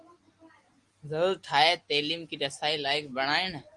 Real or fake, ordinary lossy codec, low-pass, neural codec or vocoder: real; Opus, 24 kbps; 10.8 kHz; none